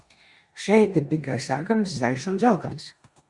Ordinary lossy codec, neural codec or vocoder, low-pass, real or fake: Opus, 64 kbps; codec, 16 kHz in and 24 kHz out, 0.9 kbps, LongCat-Audio-Codec, four codebook decoder; 10.8 kHz; fake